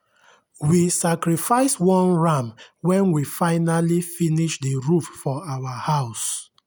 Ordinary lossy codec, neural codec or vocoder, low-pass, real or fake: none; none; none; real